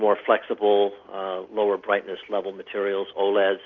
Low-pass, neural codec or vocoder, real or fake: 7.2 kHz; none; real